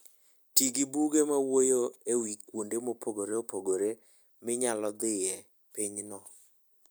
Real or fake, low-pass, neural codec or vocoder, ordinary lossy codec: real; none; none; none